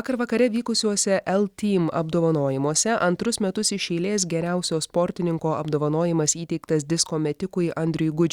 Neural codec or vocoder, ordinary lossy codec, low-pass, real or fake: none; Opus, 64 kbps; 19.8 kHz; real